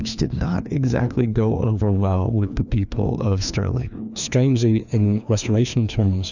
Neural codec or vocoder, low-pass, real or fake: codec, 16 kHz, 2 kbps, FreqCodec, larger model; 7.2 kHz; fake